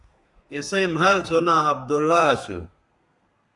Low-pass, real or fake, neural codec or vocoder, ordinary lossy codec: 10.8 kHz; fake; codec, 32 kHz, 1.9 kbps, SNAC; Opus, 64 kbps